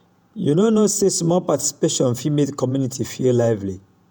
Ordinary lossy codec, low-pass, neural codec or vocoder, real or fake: none; none; vocoder, 48 kHz, 128 mel bands, Vocos; fake